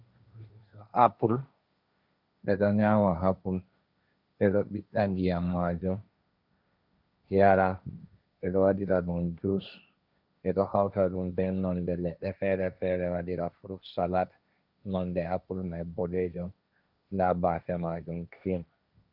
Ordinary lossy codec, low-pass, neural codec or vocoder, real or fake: Opus, 64 kbps; 5.4 kHz; codec, 16 kHz, 1.1 kbps, Voila-Tokenizer; fake